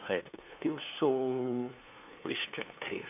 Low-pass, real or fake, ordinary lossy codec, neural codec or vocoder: 3.6 kHz; fake; none; codec, 16 kHz, 2 kbps, FunCodec, trained on LibriTTS, 25 frames a second